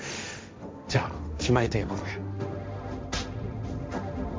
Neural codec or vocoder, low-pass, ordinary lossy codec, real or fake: codec, 16 kHz, 1.1 kbps, Voila-Tokenizer; none; none; fake